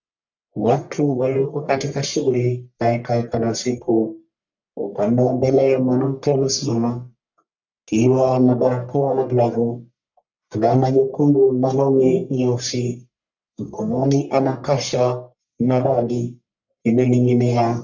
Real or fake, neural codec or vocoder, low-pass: fake; codec, 44.1 kHz, 1.7 kbps, Pupu-Codec; 7.2 kHz